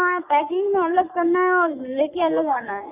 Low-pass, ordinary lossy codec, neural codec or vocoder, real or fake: 3.6 kHz; none; codec, 44.1 kHz, 3.4 kbps, Pupu-Codec; fake